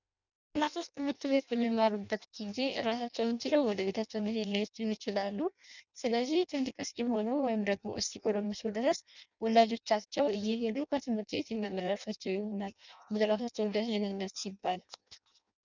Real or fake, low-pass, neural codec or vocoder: fake; 7.2 kHz; codec, 16 kHz in and 24 kHz out, 0.6 kbps, FireRedTTS-2 codec